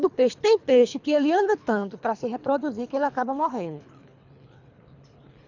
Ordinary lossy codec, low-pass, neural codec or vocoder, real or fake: none; 7.2 kHz; codec, 24 kHz, 3 kbps, HILCodec; fake